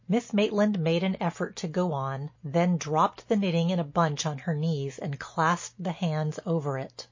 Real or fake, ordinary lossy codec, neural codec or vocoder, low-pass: real; MP3, 32 kbps; none; 7.2 kHz